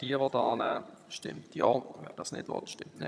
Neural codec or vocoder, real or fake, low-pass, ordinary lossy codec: vocoder, 22.05 kHz, 80 mel bands, HiFi-GAN; fake; none; none